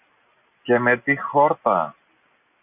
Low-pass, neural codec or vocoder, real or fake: 3.6 kHz; none; real